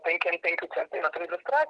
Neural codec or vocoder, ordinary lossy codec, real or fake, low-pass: none; Opus, 16 kbps; real; 9.9 kHz